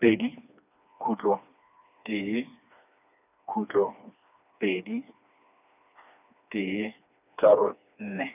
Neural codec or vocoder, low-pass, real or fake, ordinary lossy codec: codec, 16 kHz, 2 kbps, FreqCodec, smaller model; 3.6 kHz; fake; none